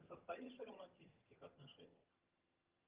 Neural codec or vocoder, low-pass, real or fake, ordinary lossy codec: vocoder, 22.05 kHz, 80 mel bands, HiFi-GAN; 3.6 kHz; fake; Opus, 32 kbps